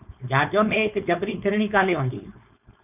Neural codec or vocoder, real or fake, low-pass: codec, 16 kHz, 4.8 kbps, FACodec; fake; 3.6 kHz